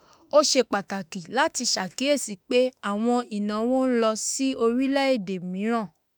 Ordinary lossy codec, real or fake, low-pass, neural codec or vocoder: none; fake; none; autoencoder, 48 kHz, 32 numbers a frame, DAC-VAE, trained on Japanese speech